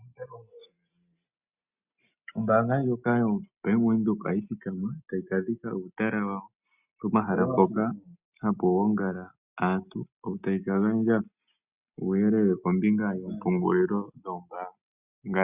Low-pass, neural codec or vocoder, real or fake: 3.6 kHz; none; real